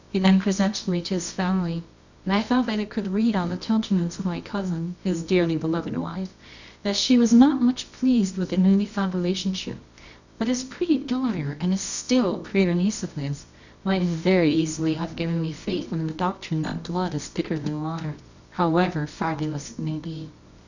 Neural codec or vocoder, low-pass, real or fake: codec, 24 kHz, 0.9 kbps, WavTokenizer, medium music audio release; 7.2 kHz; fake